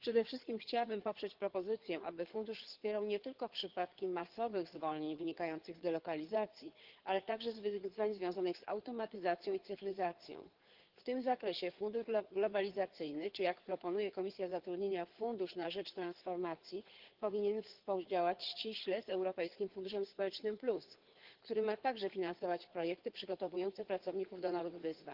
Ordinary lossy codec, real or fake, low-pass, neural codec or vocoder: Opus, 16 kbps; fake; 5.4 kHz; codec, 16 kHz in and 24 kHz out, 2.2 kbps, FireRedTTS-2 codec